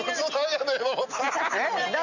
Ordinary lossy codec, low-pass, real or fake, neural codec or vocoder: none; 7.2 kHz; real; none